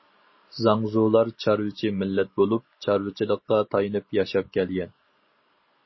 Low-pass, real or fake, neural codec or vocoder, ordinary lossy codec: 7.2 kHz; real; none; MP3, 24 kbps